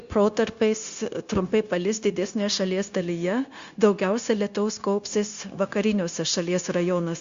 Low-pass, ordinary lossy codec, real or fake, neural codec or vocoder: 7.2 kHz; Opus, 64 kbps; fake; codec, 16 kHz, 0.9 kbps, LongCat-Audio-Codec